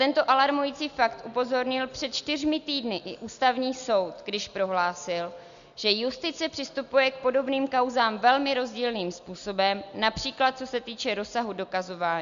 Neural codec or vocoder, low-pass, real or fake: none; 7.2 kHz; real